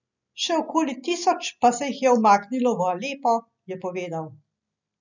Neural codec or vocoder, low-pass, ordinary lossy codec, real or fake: none; 7.2 kHz; none; real